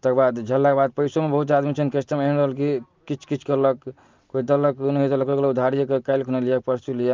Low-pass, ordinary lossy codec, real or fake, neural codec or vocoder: 7.2 kHz; Opus, 32 kbps; real; none